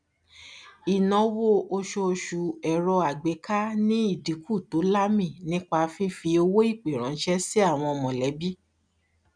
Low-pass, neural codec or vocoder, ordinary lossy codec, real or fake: 9.9 kHz; none; none; real